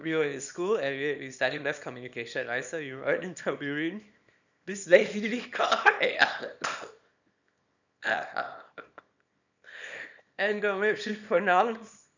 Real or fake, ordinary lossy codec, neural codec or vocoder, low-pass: fake; none; codec, 24 kHz, 0.9 kbps, WavTokenizer, small release; 7.2 kHz